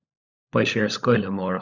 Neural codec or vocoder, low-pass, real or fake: codec, 16 kHz, 16 kbps, FunCodec, trained on LibriTTS, 50 frames a second; 7.2 kHz; fake